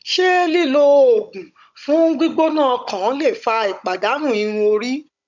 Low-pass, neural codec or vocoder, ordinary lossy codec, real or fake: 7.2 kHz; codec, 16 kHz, 16 kbps, FunCodec, trained on Chinese and English, 50 frames a second; none; fake